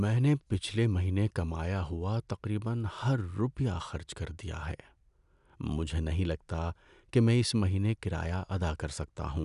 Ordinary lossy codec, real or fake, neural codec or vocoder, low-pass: none; real; none; 10.8 kHz